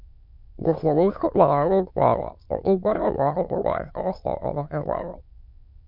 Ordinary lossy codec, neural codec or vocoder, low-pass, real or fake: MP3, 48 kbps; autoencoder, 22.05 kHz, a latent of 192 numbers a frame, VITS, trained on many speakers; 5.4 kHz; fake